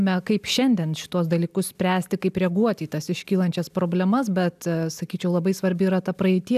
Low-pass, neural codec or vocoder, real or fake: 14.4 kHz; none; real